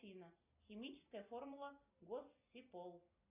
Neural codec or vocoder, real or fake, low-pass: none; real; 3.6 kHz